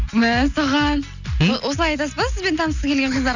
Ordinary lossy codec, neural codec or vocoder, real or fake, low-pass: none; none; real; 7.2 kHz